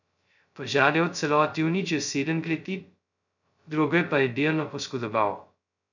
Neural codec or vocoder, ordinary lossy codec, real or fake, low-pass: codec, 16 kHz, 0.2 kbps, FocalCodec; none; fake; 7.2 kHz